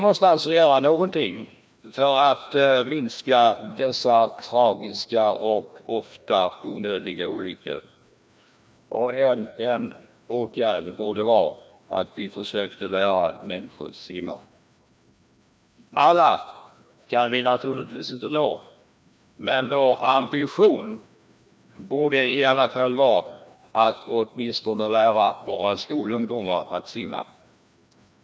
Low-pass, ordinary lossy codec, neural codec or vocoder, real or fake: none; none; codec, 16 kHz, 1 kbps, FreqCodec, larger model; fake